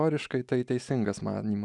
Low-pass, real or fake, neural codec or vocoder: 10.8 kHz; real; none